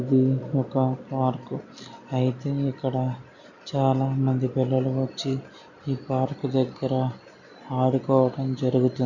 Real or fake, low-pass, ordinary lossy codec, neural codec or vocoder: real; 7.2 kHz; none; none